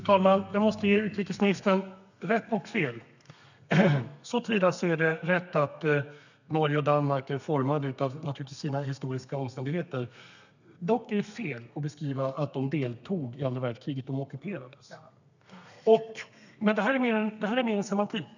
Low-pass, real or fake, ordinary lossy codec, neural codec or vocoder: 7.2 kHz; fake; none; codec, 32 kHz, 1.9 kbps, SNAC